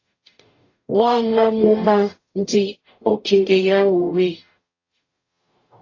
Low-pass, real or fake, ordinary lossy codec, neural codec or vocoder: 7.2 kHz; fake; AAC, 32 kbps; codec, 44.1 kHz, 0.9 kbps, DAC